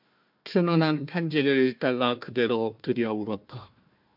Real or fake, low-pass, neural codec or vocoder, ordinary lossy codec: fake; 5.4 kHz; codec, 16 kHz, 1 kbps, FunCodec, trained on Chinese and English, 50 frames a second; MP3, 48 kbps